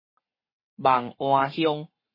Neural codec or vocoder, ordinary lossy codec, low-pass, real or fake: codec, 44.1 kHz, 7.8 kbps, Pupu-Codec; MP3, 24 kbps; 5.4 kHz; fake